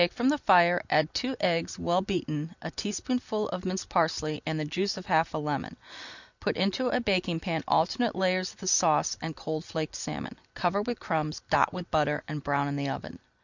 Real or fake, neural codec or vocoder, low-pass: real; none; 7.2 kHz